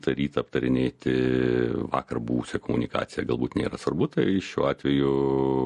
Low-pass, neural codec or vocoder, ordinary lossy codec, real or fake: 14.4 kHz; none; MP3, 48 kbps; real